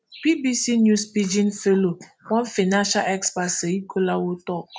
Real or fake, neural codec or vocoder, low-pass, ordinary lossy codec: real; none; none; none